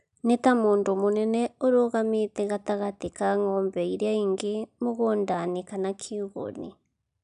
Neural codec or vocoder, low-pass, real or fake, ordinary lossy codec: none; 10.8 kHz; real; none